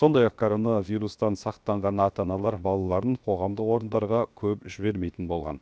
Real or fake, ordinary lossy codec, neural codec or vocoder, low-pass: fake; none; codec, 16 kHz, 0.7 kbps, FocalCodec; none